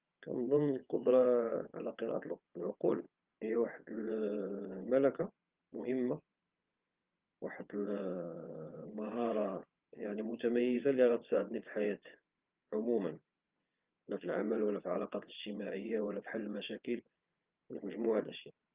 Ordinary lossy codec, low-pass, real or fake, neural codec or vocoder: Opus, 24 kbps; 3.6 kHz; fake; vocoder, 22.05 kHz, 80 mel bands, WaveNeXt